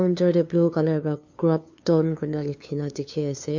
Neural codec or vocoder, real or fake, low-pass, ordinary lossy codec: codec, 16 kHz, 2 kbps, FunCodec, trained on LibriTTS, 25 frames a second; fake; 7.2 kHz; MP3, 48 kbps